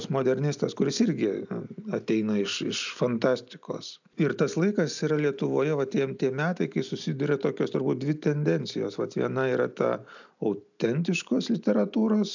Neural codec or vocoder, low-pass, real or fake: none; 7.2 kHz; real